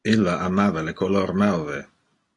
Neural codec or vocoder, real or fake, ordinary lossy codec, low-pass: none; real; AAC, 32 kbps; 10.8 kHz